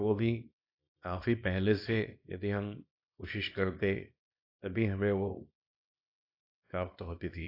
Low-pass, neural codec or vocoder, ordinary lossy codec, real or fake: 5.4 kHz; codec, 24 kHz, 0.9 kbps, WavTokenizer, small release; AAC, 32 kbps; fake